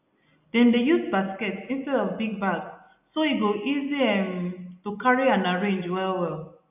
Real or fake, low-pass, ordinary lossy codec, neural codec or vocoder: real; 3.6 kHz; none; none